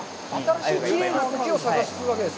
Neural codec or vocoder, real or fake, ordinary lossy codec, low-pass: none; real; none; none